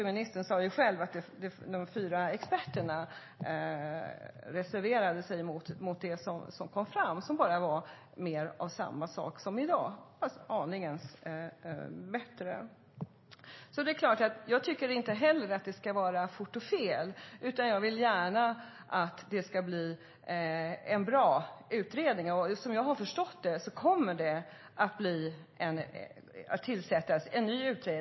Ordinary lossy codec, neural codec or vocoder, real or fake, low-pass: MP3, 24 kbps; none; real; 7.2 kHz